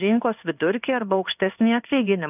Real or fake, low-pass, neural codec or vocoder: fake; 3.6 kHz; codec, 16 kHz in and 24 kHz out, 1 kbps, XY-Tokenizer